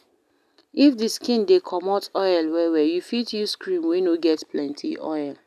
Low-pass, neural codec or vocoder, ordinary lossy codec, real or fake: 14.4 kHz; none; none; real